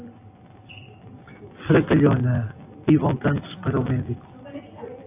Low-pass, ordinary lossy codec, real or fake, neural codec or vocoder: 3.6 kHz; AAC, 24 kbps; real; none